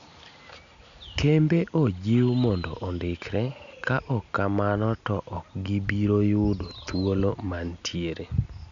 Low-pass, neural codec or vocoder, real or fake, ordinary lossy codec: 7.2 kHz; none; real; none